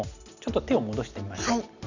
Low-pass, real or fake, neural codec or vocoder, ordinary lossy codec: 7.2 kHz; real; none; none